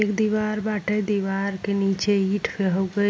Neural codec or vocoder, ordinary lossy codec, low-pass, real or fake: none; none; none; real